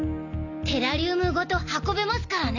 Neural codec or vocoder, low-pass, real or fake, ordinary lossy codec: none; 7.2 kHz; real; none